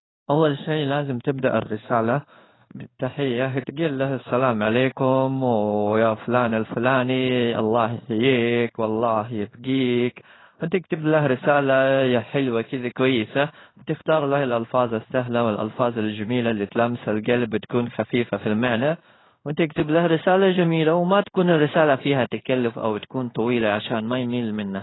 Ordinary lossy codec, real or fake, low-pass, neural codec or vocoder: AAC, 16 kbps; fake; 7.2 kHz; codec, 16 kHz in and 24 kHz out, 1 kbps, XY-Tokenizer